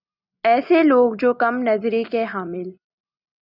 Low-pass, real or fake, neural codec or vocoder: 5.4 kHz; real; none